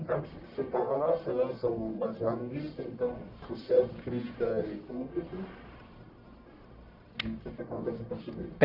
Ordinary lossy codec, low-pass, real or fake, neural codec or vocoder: none; 5.4 kHz; fake; codec, 44.1 kHz, 1.7 kbps, Pupu-Codec